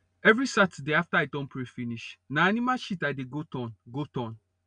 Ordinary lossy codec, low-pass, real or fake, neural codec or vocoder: none; 9.9 kHz; real; none